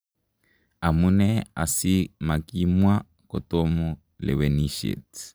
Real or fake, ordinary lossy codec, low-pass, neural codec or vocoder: real; none; none; none